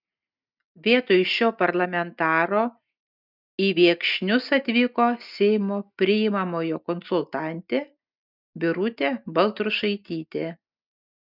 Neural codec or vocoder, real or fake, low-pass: none; real; 5.4 kHz